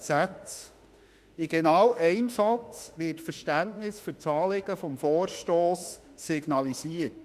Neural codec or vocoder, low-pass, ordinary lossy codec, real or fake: autoencoder, 48 kHz, 32 numbers a frame, DAC-VAE, trained on Japanese speech; 14.4 kHz; Opus, 64 kbps; fake